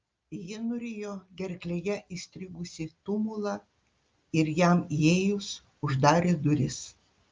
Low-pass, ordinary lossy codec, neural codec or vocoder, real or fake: 7.2 kHz; Opus, 24 kbps; none; real